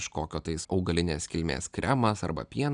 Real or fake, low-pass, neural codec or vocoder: real; 9.9 kHz; none